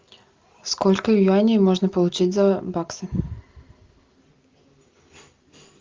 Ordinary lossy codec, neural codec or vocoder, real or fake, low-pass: Opus, 32 kbps; none; real; 7.2 kHz